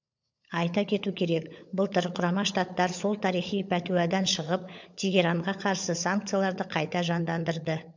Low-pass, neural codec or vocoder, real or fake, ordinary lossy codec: 7.2 kHz; codec, 16 kHz, 16 kbps, FunCodec, trained on LibriTTS, 50 frames a second; fake; MP3, 64 kbps